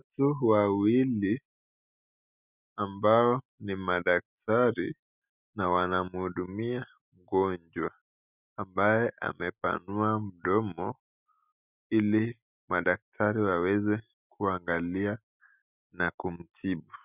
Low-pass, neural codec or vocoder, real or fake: 3.6 kHz; none; real